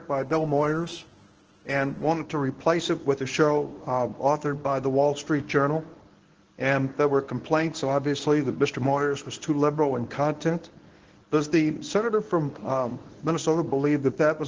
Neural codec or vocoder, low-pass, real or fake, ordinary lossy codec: codec, 24 kHz, 0.9 kbps, WavTokenizer, medium speech release version 1; 7.2 kHz; fake; Opus, 16 kbps